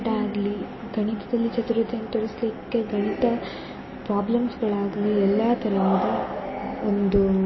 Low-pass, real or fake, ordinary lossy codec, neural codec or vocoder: 7.2 kHz; real; MP3, 24 kbps; none